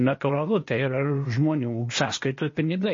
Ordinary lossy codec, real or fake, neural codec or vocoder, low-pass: MP3, 32 kbps; fake; codec, 16 kHz, 0.8 kbps, ZipCodec; 7.2 kHz